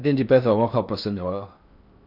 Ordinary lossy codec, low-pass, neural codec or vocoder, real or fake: none; 5.4 kHz; codec, 16 kHz in and 24 kHz out, 0.6 kbps, FocalCodec, streaming, 4096 codes; fake